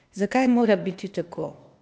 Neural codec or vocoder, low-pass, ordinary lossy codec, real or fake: codec, 16 kHz, 0.8 kbps, ZipCodec; none; none; fake